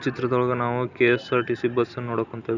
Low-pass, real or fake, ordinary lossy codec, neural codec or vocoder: 7.2 kHz; real; none; none